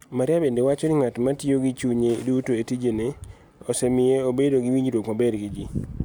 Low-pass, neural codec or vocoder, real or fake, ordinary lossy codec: none; none; real; none